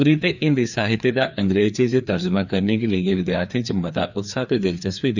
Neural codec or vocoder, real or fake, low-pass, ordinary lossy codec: codec, 16 kHz, 2 kbps, FreqCodec, larger model; fake; 7.2 kHz; none